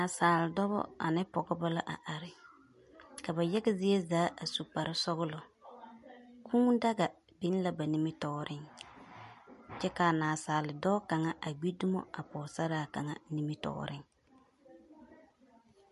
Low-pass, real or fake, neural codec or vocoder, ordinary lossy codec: 14.4 kHz; real; none; MP3, 48 kbps